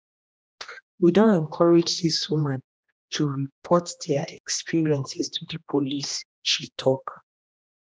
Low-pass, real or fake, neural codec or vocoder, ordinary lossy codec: none; fake; codec, 16 kHz, 1 kbps, X-Codec, HuBERT features, trained on general audio; none